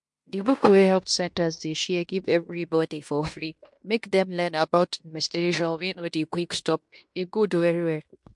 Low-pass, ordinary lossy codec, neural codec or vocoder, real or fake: 10.8 kHz; MP3, 64 kbps; codec, 16 kHz in and 24 kHz out, 0.9 kbps, LongCat-Audio-Codec, fine tuned four codebook decoder; fake